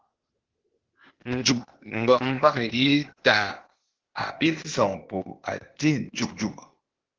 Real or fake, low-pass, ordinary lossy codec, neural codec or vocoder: fake; 7.2 kHz; Opus, 16 kbps; codec, 16 kHz, 0.8 kbps, ZipCodec